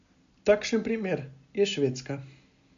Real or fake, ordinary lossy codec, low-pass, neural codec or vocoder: real; MP3, 64 kbps; 7.2 kHz; none